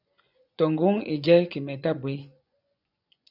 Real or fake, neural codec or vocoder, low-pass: fake; vocoder, 24 kHz, 100 mel bands, Vocos; 5.4 kHz